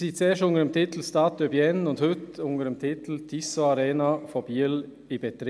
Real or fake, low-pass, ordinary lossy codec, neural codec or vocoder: real; none; none; none